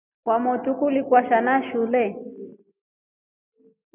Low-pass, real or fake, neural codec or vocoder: 3.6 kHz; real; none